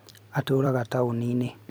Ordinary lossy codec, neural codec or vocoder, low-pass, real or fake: none; vocoder, 44.1 kHz, 128 mel bands every 256 samples, BigVGAN v2; none; fake